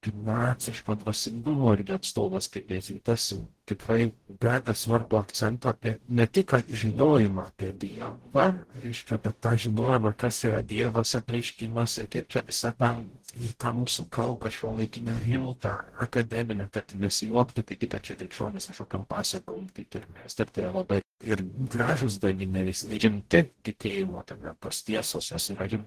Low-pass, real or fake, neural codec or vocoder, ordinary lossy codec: 14.4 kHz; fake; codec, 44.1 kHz, 0.9 kbps, DAC; Opus, 16 kbps